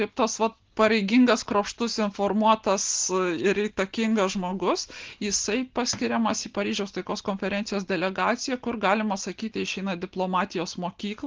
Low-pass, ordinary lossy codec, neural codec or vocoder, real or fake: 7.2 kHz; Opus, 16 kbps; none; real